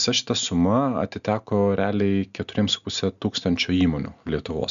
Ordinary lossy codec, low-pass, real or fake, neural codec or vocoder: AAC, 96 kbps; 7.2 kHz; real; none